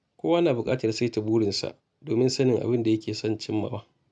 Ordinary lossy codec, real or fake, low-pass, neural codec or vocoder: none; real; none; none